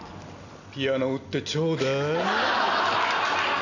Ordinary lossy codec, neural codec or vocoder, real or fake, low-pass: none; none; real; 7.2 kHz